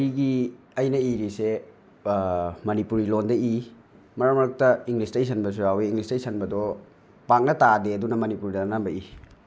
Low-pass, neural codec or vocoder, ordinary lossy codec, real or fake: none; none; none; real